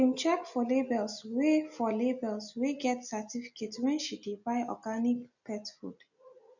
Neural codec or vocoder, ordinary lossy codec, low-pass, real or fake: none; none; 7.2 kHz; real